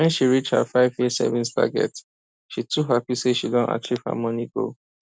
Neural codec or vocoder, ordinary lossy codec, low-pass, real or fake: none; none; none; real